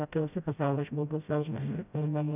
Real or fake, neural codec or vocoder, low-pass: fake; codec, 16 kHz, 0.5 kbps, FreqCodec, smaller model; 3.6 kHz